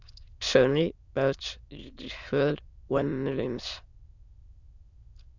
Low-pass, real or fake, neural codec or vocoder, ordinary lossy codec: 7.2 kHz; fake; autoencoder, 22.05 kHz, a latent of 192 numbers a frame, VITS, trained on many speakers; Opus, 64 kbps